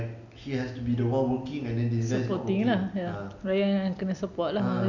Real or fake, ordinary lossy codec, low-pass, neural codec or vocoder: real; none; 7.2 kHz; none